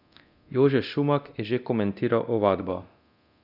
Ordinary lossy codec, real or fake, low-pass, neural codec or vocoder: none; fake; 5.4 kHz; codec, 24 kHz, 0.9 kbps, DualCodec